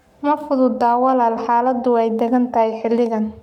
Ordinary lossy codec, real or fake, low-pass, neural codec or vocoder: none; fake; 19.8 kHz; codec, 44.1 kHz, 7.8 kbps, Pupu-Codec